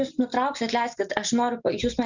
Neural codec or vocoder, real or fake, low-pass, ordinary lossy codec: none; real; 7.2 kHz; Opus, 64 kbps